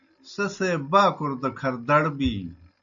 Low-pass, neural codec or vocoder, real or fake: 7.2 kHz; none; real